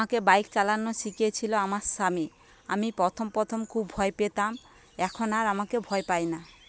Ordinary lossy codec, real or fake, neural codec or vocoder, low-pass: none; real; none; none